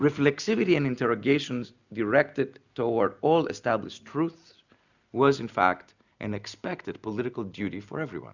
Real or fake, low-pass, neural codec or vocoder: real; 7.2 kHz; none